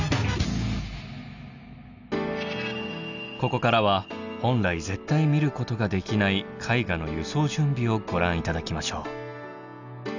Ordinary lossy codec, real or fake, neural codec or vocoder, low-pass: none; real; none; 7.2 kHz